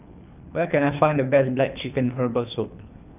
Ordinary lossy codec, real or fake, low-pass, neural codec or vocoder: none; fake; 3.6 kHz; codec, 24 kHz, 3 kbps, HILCodec